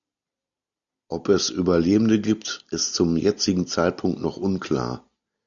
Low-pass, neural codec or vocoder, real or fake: 7.2 kHz; none; real